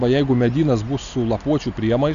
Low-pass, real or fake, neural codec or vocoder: 7.2 kHz; real; none